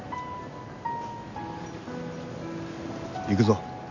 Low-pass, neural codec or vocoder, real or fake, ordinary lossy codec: 7.2 kHz; none; real; none